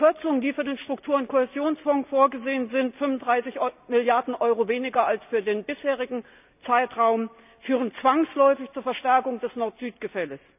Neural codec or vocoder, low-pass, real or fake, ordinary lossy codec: none; 3.6 kHz; real; none